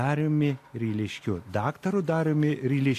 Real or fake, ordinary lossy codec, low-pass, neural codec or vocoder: real; AAC, 64 kbps; 14.4 kHz; none